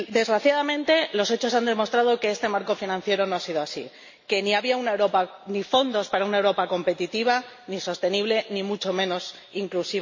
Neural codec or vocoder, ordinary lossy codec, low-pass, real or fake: none; MP3, 32 kbps; 7.2 kHz; real